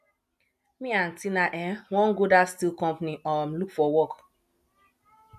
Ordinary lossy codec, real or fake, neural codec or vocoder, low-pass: none; real; none; 14.4 kHz